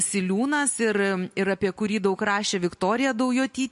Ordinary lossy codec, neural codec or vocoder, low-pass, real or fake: MP3, 48 kbps; none; 14.4 kHz; real